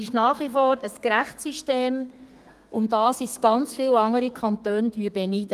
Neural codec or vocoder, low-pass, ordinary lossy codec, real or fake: codec, 32 kHz, 1.9 kbps, SNAC; 14.4 kHz; Opus, 32 kbps; fake